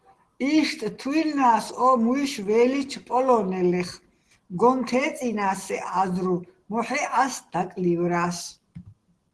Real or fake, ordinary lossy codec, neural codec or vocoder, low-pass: real; Opus, 16 kbps; none; 10.8 kHz